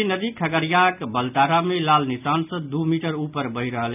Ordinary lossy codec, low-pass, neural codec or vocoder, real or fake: none; 3.6 kHz; none; real